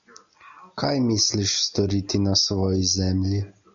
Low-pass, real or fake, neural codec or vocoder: 7.2 kHz; real; none